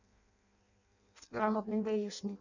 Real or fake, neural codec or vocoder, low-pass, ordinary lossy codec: fake; codec, 16 kHz in and 24 kHz out, 0.6 kbps, FireRedTTS-2 codec; 7.2 kHz; none